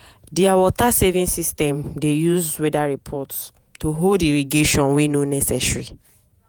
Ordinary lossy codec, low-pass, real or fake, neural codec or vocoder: none; none; fake; vocoder, 48 kHz, 128 mel bands, Vocos